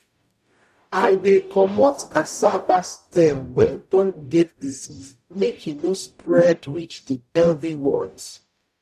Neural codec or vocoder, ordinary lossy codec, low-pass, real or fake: codec, 44.1 kHz, 0.9 kbps, DAC; AAC, 96 kbps; 14.4 kHz; fake